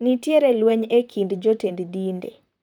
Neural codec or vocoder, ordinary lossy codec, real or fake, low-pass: vocoder, 44.1 kHz, 128 mel bands, Pupu-Vocoder; none; fake; 19.8 kHz